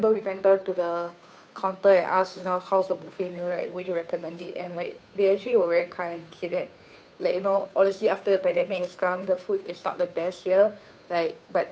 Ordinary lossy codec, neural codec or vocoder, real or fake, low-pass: none; codec, 16 kHz, 2 kbps, FunCodec, trained on Chinese and English, 25 frames a second; fake; none